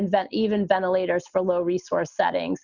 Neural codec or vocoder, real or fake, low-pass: none; real; 7.2 kHz